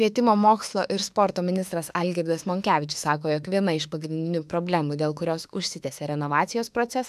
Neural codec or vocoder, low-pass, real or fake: autoencoder, 48 kHz, 32 numbers a frame, DAC-VAE, trained on Japanese speech; 14.4 kHz; fake